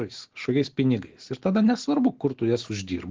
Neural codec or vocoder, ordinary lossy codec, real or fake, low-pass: none; Opus, 16 kbps; real; 7.2 kHz